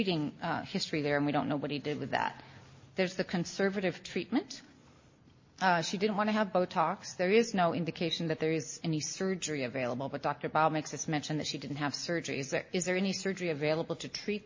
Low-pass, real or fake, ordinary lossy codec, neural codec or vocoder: 7.2 kHz; real; MP3, 32 kbps; none